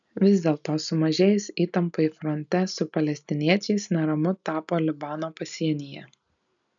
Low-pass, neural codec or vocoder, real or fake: 7.2 kHz; none; real